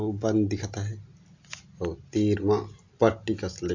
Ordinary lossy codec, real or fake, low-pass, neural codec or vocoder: AAC, 48 kbps; real; 7.2 kHz; none